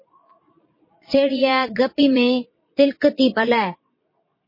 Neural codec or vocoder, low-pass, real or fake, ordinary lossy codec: vocoder, 22.05 kHz, 80 mel bands, Vocos; 5.4 kHz; fake; MP3, 24 kbps